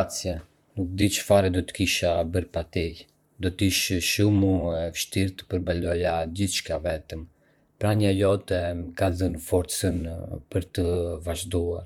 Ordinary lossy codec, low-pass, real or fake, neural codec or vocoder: none; 19.8 kHz; fake; vocoder, 44.1 kHz, 128 mel bands, Pupu-Vocoder